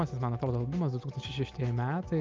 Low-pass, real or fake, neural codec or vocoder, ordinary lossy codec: 7.2 kHz; real; none; Opus, 32 kbps